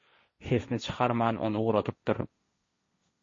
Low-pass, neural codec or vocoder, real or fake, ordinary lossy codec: 7.2 kHz; codec, 16 kHz, 1.1 kbps, Voila-Tokenizer; fake; MP3, 32 kbps